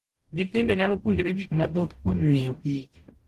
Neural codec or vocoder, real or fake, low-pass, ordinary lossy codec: codec, 44.1 kHz, 0.9 kbps, DAC; fake; 14.4 kHz; Opus, 16 kbps